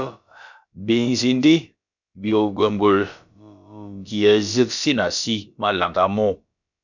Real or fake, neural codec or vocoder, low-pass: fake; codec, 16 kHz, about 1 kbps, DyCAST, with the encoder's durations; 7.2 kHz